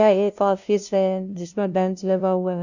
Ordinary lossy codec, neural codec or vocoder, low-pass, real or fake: none; codec, 16 kHz, 0.5 kbps, FunCodec, trained on LibriTTS, 25 frames a second; 7.2 kHz; fake